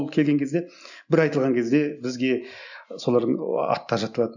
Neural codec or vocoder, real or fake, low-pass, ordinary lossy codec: none; real; 7.2 kHz; none